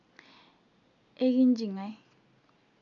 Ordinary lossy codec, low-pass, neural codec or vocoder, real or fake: none; 7.2 kHz; none; real